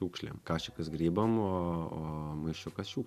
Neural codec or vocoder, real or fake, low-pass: none; real; 14.4 kHz